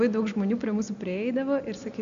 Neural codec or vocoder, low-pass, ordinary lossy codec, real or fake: none; 7.2 kHz; MP3, 64 kbps; real